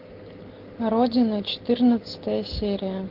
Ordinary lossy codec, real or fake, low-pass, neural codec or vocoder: Opus, 16 kbps; real; 5.4 kHz; none